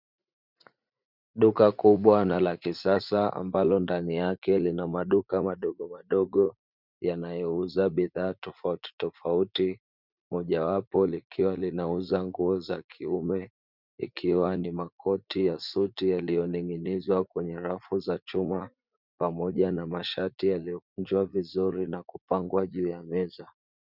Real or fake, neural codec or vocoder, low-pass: fake; vocoder, 44.1 kHz, 80 mel bands, Vocos; 5.4 kHz